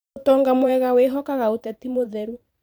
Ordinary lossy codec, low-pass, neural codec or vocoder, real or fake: none; none; vocoder, 44.1 kHz, 128 mel bands every 512 samples, BigVGAN v2; fake